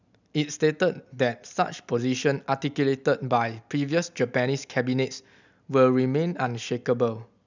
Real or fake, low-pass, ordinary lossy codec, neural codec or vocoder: real; 7.2 kHz; none; none